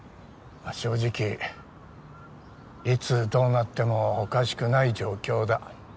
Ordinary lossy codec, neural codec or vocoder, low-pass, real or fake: none; none; none; real